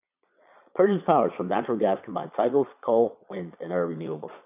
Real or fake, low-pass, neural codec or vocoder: fake; 3.6 kHz; vocoder, 22.05 kHz, 80 mel bands, Vocos